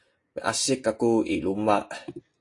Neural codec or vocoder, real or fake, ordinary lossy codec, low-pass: none; real; AAC, 48 kbps; 10.8 kHz